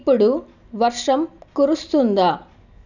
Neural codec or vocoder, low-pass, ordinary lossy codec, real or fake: none; 7.2 kHz; none; real